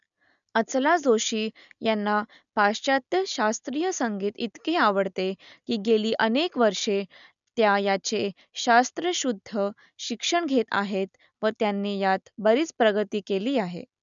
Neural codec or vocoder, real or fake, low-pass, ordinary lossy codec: none; real; 7.2 kHz; none